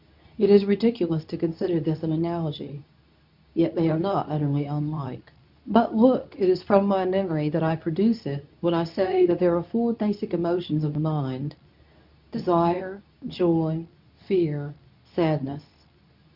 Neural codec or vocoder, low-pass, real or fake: codec, 24 kHz, 0.9 kbps, WavTokenizer, medium speech release version 2; 5.4 kHz; fake